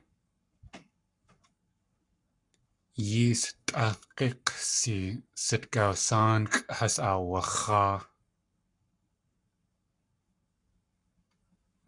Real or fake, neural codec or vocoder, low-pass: fake; codec, 44.1 kHz, 7.8 kbps, Pupu-Codec; 10.8 kHz